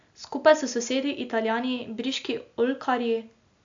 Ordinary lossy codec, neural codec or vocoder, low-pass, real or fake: AAC, 64 kbps; none; 7.2 kHz; real